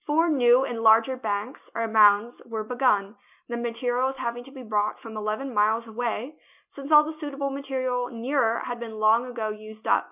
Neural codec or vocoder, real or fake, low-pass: none; real; 3.6 kHz